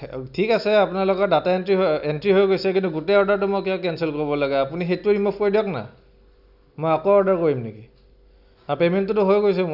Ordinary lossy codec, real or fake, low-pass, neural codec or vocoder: none; real; 5.4 kHz; none